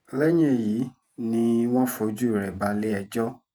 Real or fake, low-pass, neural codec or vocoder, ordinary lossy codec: fake; none; vocoder, 48 kHz, 128 mel bands, Vocos; none